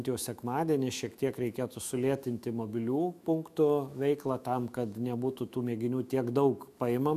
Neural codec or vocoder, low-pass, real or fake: autoencoder, 48 kHz, 128 numbers a frame, DAC-VAE, trained on Japanese speech; 14.4 kHz; fake